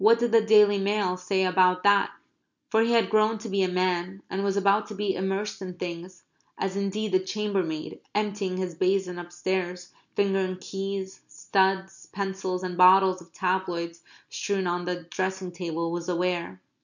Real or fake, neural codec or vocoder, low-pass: real; none; 7.2 kHz